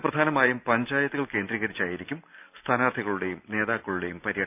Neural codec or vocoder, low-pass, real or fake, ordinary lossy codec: none; 3.6 kHz; real; none